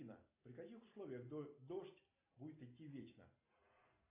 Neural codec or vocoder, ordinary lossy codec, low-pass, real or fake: none; AAC, 24 kbps; 3.6 kHz; real